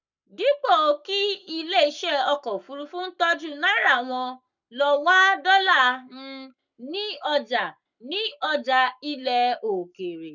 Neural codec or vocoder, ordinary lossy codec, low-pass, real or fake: codec, 44.1 kHz, 7.8 kbps, Pupu-Codec; none; 7.2 kHz; fake